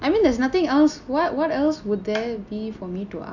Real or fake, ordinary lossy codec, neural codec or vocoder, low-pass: real; none; none; 7.2 kHz